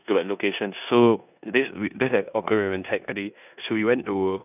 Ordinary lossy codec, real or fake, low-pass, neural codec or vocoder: none; fake; 3.6 kHz; codec, 16 kHz in and 24 kHz out, 0.9 kbps, LongCat-Audio-Codec, four codebook decoder